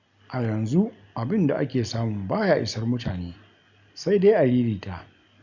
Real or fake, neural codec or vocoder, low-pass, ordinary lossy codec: real; none; 7.2 kHz; none